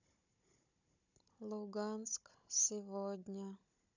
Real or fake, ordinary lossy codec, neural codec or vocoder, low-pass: fake; none; codec, 16 kHz, 16 kbps, FunCodec, trained on Chinese and English, 50 frames a second; 7.2 kHz